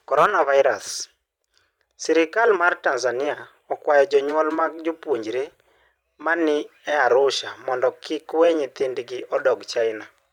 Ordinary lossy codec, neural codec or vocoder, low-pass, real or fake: none; vocoder, 44.1 kHz, 128 mel bands every 512 samples, BigVGAN v2; 19.8 kHz; fake